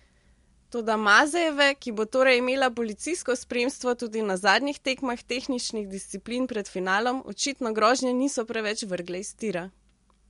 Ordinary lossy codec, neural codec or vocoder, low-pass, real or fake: MP3, 64 kbps; none; 10.8 kHz; real